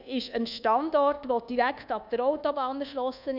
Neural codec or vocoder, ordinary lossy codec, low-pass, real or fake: codec, 24 kHz, 1.2 kbps, DualCodec; none; 5.4 kHz; fake